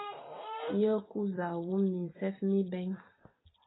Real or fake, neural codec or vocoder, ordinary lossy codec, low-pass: fake; vocoder, 44.1 kHz, 128 mel bands every 256 samples, BigVGAN v2; AAC, 16 kbps; 7.2 kHz